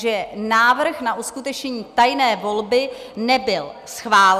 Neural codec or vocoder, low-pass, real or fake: none; 14.4 kHz; real